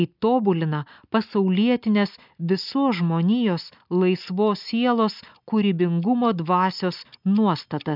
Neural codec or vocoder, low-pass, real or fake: none; 5.4 kHz; real